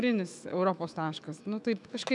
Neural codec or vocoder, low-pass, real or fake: autoencoder, 48 kHz, 128 numbers a frame, DAC-VAE, trained on Japanese speech; 10.8 kHz; fake